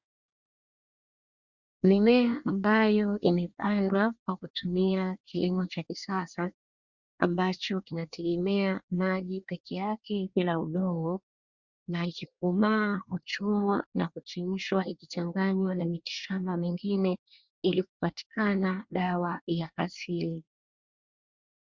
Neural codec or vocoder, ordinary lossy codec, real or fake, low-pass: codec, 24 kHz, 1 kbps, SNAC; Opus, 64 kbps; fake; 7.2 kHz